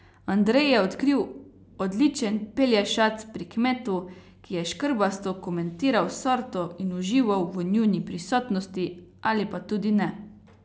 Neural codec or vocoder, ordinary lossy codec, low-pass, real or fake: none; none; none; real